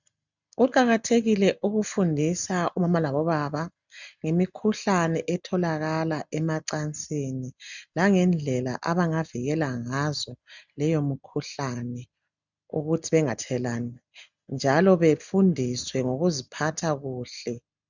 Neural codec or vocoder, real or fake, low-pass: none; real; 7.2 kHz